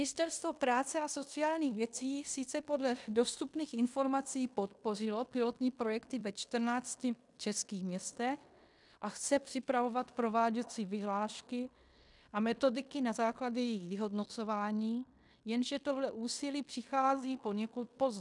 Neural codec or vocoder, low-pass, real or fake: codec, 16 kHz in and 24 kHz out, 0.9 kbps, LongCat-Audio-Codec, fine tuned four codebook decoder; 10.8 kHz; fake